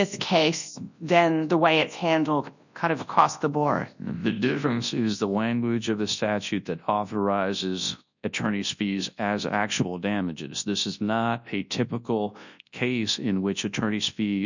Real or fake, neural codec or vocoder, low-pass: fake; codec, 24 kHz, 0.9 kbps, WavTokenizer, large speech release; 7.2 kHz